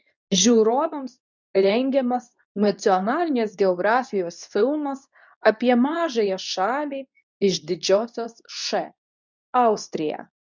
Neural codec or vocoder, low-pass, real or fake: codec, 24 kHz, 0.9 kbps, WavTokenizer, medium speech release version 2; 7.2 kHz; fake